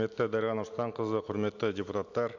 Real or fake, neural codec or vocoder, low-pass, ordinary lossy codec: real; none; 7.2 kHz; none